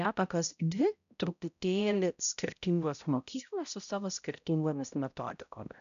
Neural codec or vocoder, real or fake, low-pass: codec, 16 kHz, 0.5 kbps, X-Codec, HuBERT features, trained on balanced general audio; fake; 7.2 kHz